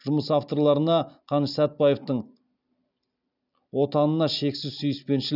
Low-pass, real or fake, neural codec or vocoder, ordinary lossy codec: 5.4 kHz; real; none; none